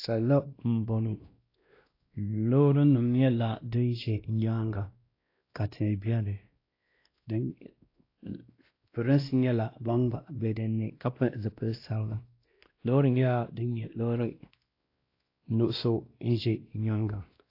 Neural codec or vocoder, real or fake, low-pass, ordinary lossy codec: codec, 16 kHz, 1 kbps, X-Codec, WavLM features, trained on Multilingual LibriSpeech; fake; 5.4 kHz; AAC, 32 kbps